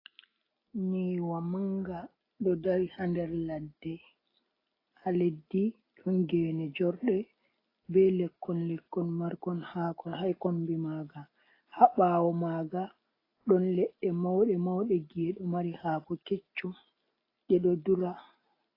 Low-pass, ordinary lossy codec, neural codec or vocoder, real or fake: 5.4 kHz; AAC, 24 kbps; none; real